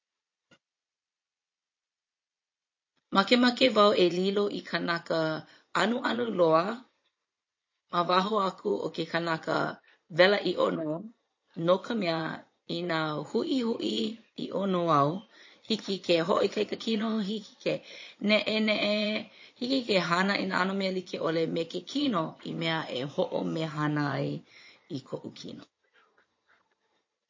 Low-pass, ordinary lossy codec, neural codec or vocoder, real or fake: 7.2 kHz; MP3, 32 kbps; vocoder, 22.05 kHz, 80 mel bands, Vocos; fake